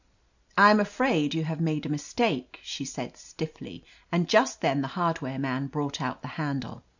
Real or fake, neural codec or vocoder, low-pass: real; none; 7.2 kHz